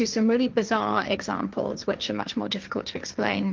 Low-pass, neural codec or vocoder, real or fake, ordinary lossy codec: 7.2 kHz; codec, 16 kHz, 1.1 kbps, Voila-Tokenizer; fake; Opus, 24 kbps